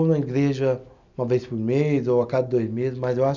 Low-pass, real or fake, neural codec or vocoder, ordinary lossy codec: 7.2 kHz; real; none; none